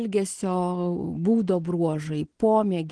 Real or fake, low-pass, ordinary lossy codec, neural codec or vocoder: real; 9.9 kHz; Opus, 16 kbps; none